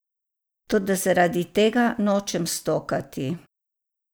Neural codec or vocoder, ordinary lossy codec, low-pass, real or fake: none; none; none; real